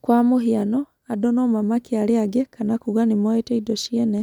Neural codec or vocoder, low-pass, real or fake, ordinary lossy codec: none; 19.8 kHz; real; none